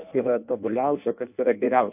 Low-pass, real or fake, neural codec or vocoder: 3.6 kHz; fake; codec, 16 kHz in and 24 kHz out, 0.6 kbps, FireRedTTS-2 codec